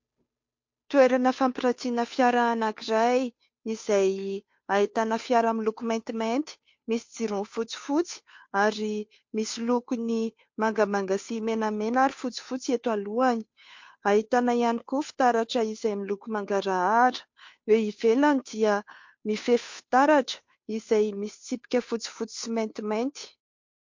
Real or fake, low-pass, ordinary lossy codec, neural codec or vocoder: fake; 7.2 kHz; MP3, 48 kbps; codec, 16 kHz, 2 kbps, FunCodec, trained on Chinese and English, 25 frames a second